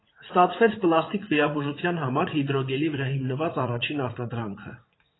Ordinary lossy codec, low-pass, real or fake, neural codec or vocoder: AAC, 16 kbps; 7.2 kHz; fake; vocoder, 44.1 kHz, 80 mel bands, Vocos